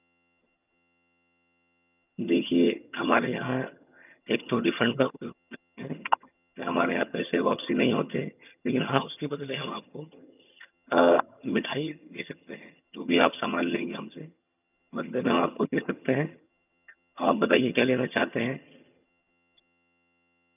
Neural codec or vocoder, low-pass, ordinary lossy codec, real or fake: vocoder, 22.05 kHz, 80 mel bands, HiFi-GAN; 3.6 kHz; none; fake